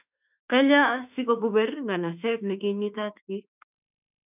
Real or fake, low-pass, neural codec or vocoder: fake; 3.6 kHz; autoencoder, 48 kHz, 32 numbers a frame, DAC-VAE, trained on Japanese speech